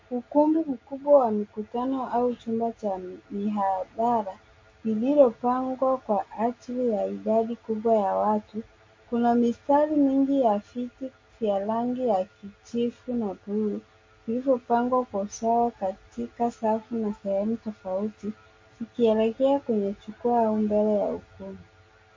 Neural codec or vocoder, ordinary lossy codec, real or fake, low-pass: none; MP3, 32 kbps; real; 7.2 kHz